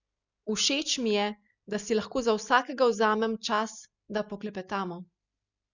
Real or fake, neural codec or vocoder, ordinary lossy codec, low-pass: real; none; none; 7.2 kHz